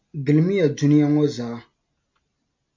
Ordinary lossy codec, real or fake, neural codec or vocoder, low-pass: MP3, 48 kbps; real; none; 7.2 kHz